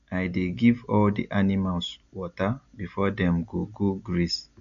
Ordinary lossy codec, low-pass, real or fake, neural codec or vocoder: none; 7.2 kHz; real; none